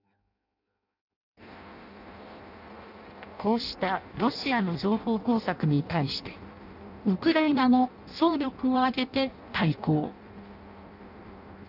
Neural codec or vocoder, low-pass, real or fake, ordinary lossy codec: codec, 16 kHz in and 24 kHz out, 0.6 kbps, FireRedTTS-2 codec; 5.4 kHz; fake; none